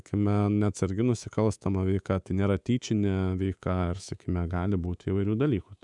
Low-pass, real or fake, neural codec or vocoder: 10.8 kHz; fake; codec, 24 kHz, 3.1 kbps, DualCodec